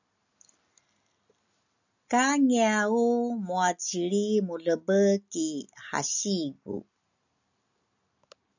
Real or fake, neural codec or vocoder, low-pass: real; none; 7.2 kHz